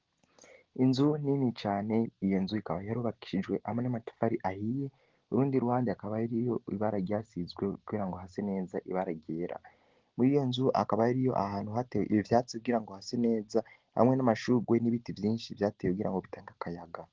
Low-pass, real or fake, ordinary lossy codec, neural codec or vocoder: 7.2 kHz; real; Opus, 16 kbps; none